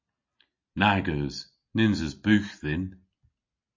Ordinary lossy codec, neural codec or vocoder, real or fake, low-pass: MP3, 32 kbps; none; real; 7.2 kHz